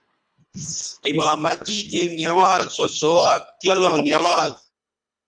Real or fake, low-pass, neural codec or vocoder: fake; 9.9 kHz; codec, 24 kHz, 1.5 kbps, HILCodec